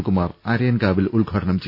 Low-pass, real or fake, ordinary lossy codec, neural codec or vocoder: 5.4 kHz; real; none; none